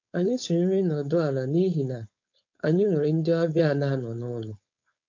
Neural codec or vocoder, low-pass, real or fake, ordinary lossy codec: codec, 16 kHz, 4.8 kbps, FACodec; 7.2 kHz; fake; MP3, 48 kbps